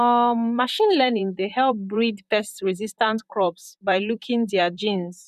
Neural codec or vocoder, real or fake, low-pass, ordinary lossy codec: vocoder, 44.1 kHz, 128 mel bands, Pupu-Vocoder; fake; 14.4 kHz; none